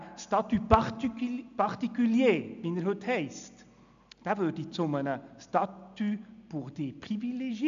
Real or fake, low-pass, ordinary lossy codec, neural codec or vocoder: real; 7.2 kHz; AAC, 96 kbps; none